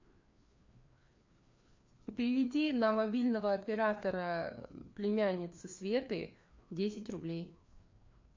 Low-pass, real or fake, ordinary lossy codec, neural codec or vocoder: 7.2 kHz; fake; MP3, 48 kbps; codec, 16 kHz, 2 kbps, FreqCodec, larger model